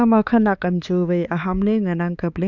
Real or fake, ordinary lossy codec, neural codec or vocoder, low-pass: fake; none; codec, 16 kHz, 4 kbps, X-Codec, HuBERT features, trained on balanced general audio; 7.2 kHz